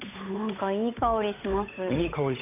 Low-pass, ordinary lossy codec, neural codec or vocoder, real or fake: 3.6 kHz; none; codec, 16 kHz, 8 kbps, FunCodec, trained on Chinese and English, 25 frames a second; fake